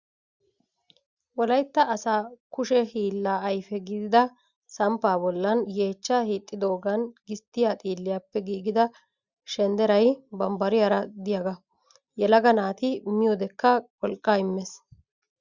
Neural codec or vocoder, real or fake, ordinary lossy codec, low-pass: none; real; Opus, 64 kbps; 7.2 kHz